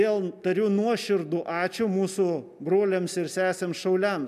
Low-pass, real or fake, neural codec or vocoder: 14.4 kHz; real; none